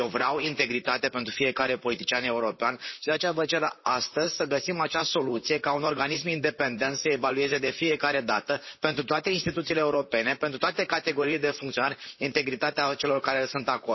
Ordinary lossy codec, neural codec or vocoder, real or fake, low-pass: MP3, 24 kbps; vocoder, 44.1 kHz, 128 mel bands, Pupu-Vocoder; fake; 7.2 kHz